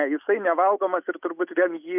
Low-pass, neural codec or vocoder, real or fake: 3.6 kHz; none; real